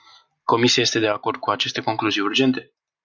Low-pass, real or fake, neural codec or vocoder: 7.2 kHz; real; none